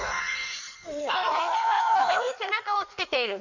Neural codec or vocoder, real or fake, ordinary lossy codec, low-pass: codec, 16 kHz in and 24 kHz out, 1.1 kbps, FireRedTTS-2 codec; fake; none; 7.2 kHz